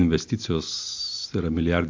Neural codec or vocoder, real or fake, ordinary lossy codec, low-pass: none; real; MP3, 64 kbps; 7.2 kHz